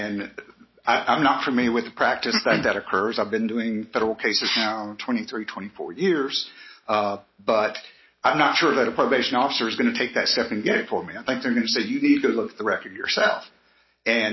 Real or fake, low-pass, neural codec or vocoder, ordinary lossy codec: real; 7.2 kHz; none; MP3, 24 kbps